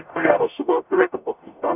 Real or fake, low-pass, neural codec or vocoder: fake; 3.6 kHz; codec, 44.1 kHz, 0.9 kbps, DAC